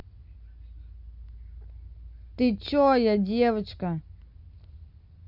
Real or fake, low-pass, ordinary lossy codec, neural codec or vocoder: real; 5.4 kHz; Opus, 64 kbps; none